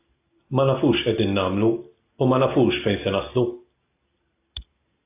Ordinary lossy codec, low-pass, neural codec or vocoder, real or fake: AAC, 24 kbps; 3.6 kHz; none; real